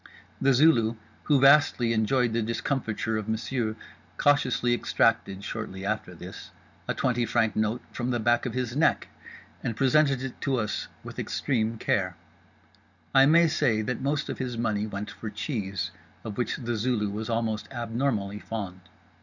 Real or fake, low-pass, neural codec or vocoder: real; 7.2 kHz; none